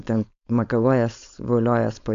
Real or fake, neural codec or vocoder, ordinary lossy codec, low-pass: fake; codec, 16 kHz, 4.8 kbps, FACodec; AAC, 64 kbps; 7.2 kHz